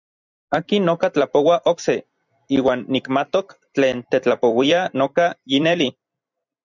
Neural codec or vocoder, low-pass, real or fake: vocoder, 44.1 kHz, 128 mel bands every 256 samples, BigVGAN v2; 7.2 kHz; fake